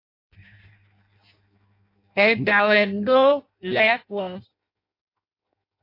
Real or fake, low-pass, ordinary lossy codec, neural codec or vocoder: fake; 5.4 kHz; AAC, 32 kbps; codec, 16 kHz in and 24 kHz out, 0.6 kbps, FireRedTTS-2 codec